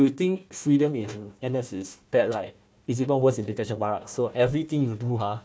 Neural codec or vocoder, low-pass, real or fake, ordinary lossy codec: codec, 16 kHz, 1 kbps, FunCodec, trained on Chinese and English, 50 frames a second; none; fake; none